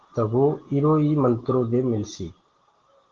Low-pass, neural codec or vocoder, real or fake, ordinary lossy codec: 7.2 kHz; none; real; Opus, 16 kbps